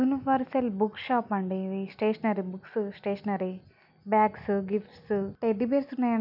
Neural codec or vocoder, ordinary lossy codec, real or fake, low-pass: none; none; real; 5.4 kHz